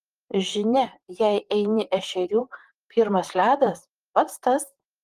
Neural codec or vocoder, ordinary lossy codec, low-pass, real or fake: vocoder, 44.1 kHz, 128 mel bands, Pupu-Vocoder; Opus, 24 kbps; 14.4 kHz; fake